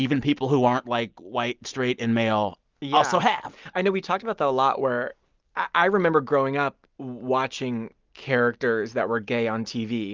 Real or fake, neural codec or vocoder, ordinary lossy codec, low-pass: real; none; Opus, 32 kbps; 7.2 kHz